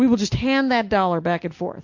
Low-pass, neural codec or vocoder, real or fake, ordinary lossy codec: 7.2 kHz; none; real; MP3, 48 kbps